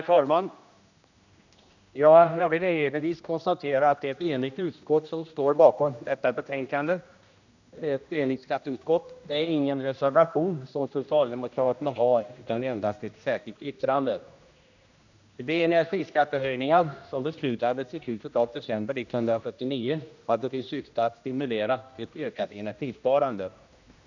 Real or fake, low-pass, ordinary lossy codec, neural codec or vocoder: fake; 7.2 kHz; none; codec, 16 kHz, 1 kbps, X-Codec, HuBERT features, trained on general audio